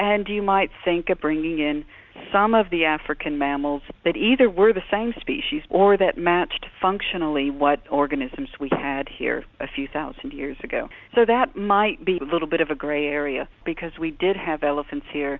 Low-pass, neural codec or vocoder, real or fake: 7.2 kHz; none; real